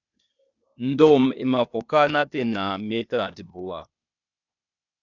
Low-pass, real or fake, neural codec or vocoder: 7.2 kHz; fake; codec, 16 kHz, 0.8 kbps, ZipCodec